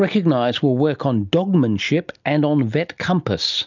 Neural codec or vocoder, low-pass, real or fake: none; 7.2 kHz; real